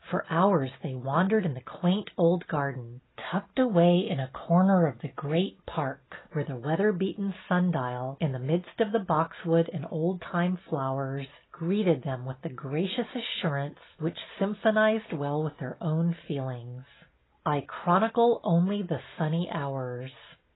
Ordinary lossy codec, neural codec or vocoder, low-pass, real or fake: AAC, 16 kbps; none; 7.2 kHz; real